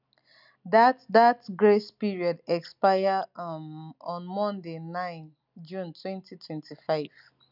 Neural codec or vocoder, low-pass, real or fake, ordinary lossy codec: none; 5.4 kHz; real; none